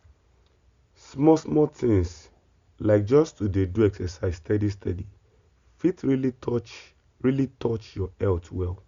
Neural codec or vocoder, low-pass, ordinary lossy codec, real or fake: none; 7.2 kHz; Opus, 64 kbps; real